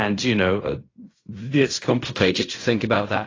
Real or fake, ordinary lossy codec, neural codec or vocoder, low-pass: fake; AAC, 32 kbps; codec, 16 kHz in and 24 kHz out, 0.4 kbps, LongCat-Audio-Codec, fine tuned four codebook decoder; 7.2 kHz